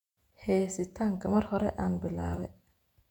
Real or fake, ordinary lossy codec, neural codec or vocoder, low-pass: fake; none; vocoder, 48 kHz, 128 mel bands, Vocos; 19.8 kHz